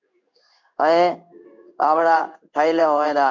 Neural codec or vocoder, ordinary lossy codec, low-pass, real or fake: codec, 16 kHz in and 24 kHz out, 1 kbps, XY-Tokenizer; MP3, 64 kbps; 7.2 kHz; fake